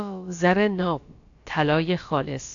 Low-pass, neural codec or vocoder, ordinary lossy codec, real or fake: 7.2 kHz; codec, 16 kHz, about 1 kbps, DyCAST, with the encoder's durations; AAC, 48 kbps; fake